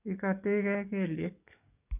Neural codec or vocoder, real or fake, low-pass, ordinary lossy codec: codec, 44.1 kHz, 7.8 kbps, DAC; fake; 3.6 kHz; none